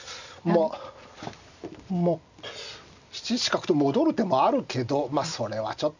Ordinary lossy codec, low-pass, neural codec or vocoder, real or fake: none; 7.2 kHz; none; real